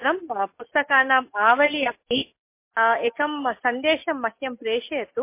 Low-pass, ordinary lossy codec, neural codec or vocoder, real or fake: 3.6 kHz; MP3, 24 kbps; none; real